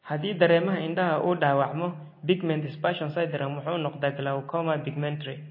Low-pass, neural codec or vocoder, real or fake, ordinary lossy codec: 5.4 kHz; none; real; MP3, 24 kbps